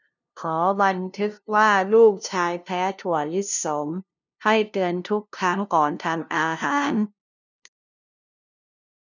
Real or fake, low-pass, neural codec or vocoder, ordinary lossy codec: fake; 7.2 kHz; codec, 16 kHz, 0.5 kbps, FunCodec, trained on LibriTTS, 25 frames a second; none